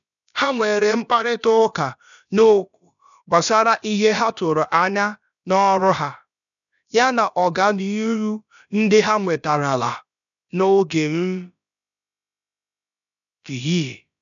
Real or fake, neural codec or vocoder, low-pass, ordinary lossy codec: fake; codec, 16 kHz, about 1 kbps, DyCAST, with the encoder's durations; 7.2 kHz; none